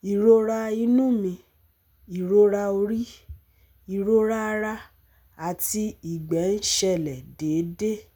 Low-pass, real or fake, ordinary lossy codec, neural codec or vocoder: none; real; none; none